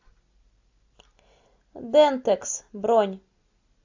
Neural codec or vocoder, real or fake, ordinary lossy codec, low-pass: none; real; AAC, 48 kbps; 7.2 kHz